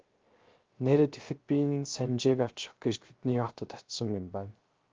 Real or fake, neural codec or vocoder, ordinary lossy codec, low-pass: fake; codec, 16 kHz, 0.3 kbps, FocalCodec; Opus, 24 kbps; 7.2 kHz